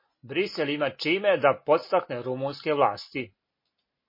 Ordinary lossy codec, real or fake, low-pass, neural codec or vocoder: MP3, 24 kbps; real; 5.4 kHz; none